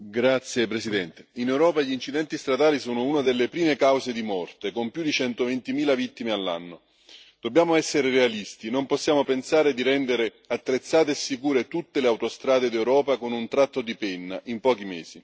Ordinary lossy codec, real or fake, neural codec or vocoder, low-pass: none; real; none; none